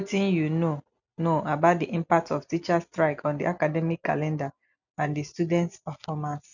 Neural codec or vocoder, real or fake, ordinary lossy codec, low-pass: none; real; none; 7.2 kHz